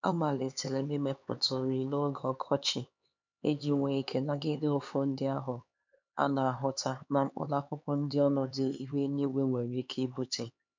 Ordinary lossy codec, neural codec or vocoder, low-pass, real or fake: MP3, 64 kbps; codec, 16 kHz, 4 kbps, X-Codec, HuBERT features, trained on LibriSpeech; 7.2 kHz; fake